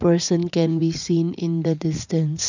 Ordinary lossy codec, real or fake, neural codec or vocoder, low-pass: none; fake; vocoder, 44.1 kHz, 80 mel bands, Vocos; 7.2 kHz